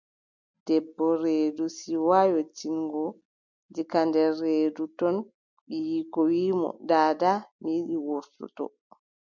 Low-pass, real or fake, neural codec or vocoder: 7.2 kHz; real; none